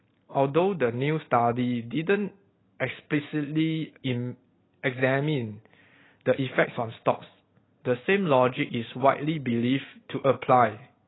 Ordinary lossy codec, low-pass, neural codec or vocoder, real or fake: AAC, 16 kbps; 7.2 kHz; none; real